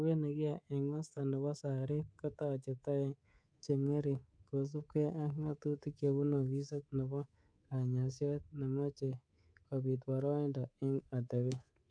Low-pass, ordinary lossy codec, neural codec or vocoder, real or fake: 9.9 kHz; none; codec, 24 kHz, 3.1 kbps, DualCodec; fake